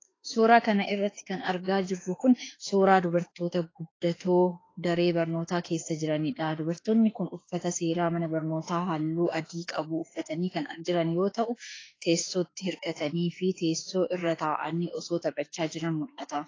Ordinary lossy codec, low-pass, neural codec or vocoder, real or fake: AAC, 32 kbps; 7.2 kHz; autoencoder, 48 kHz, 32 numbers a frame, DAC-VAE, trained on Japanese speech; fake